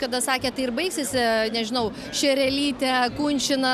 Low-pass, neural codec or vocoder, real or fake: 14.4 kHz; none; real